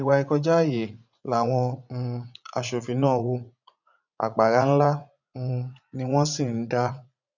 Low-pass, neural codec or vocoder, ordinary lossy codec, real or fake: 7.2 kHz; vocoder, 44.1 kHz, 80 mel bands, Vocos; none; fake